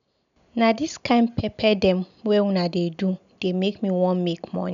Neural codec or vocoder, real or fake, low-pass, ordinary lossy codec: none; real; 7.2 kHz; none